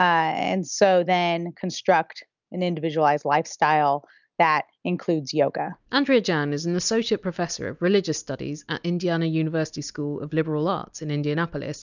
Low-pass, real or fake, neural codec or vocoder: 7.2 kHz; real; none